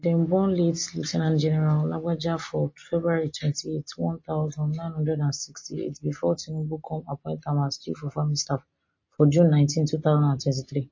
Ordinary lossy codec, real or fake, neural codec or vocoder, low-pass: MP3, 32 kbps; real; none; 7.2 kHz